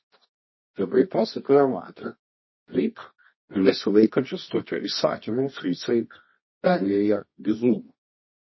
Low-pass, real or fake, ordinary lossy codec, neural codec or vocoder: 7.2 kHz; fake; MP3, 24 kbps; codec, 24 kHz, 0.9 kbps, WavTokenizer, medium music audio release